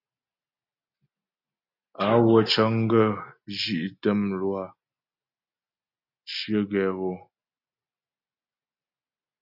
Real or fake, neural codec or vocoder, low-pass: real; none; 5.4 kHz